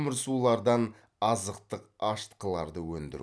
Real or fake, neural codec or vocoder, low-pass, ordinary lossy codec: real; none; none; none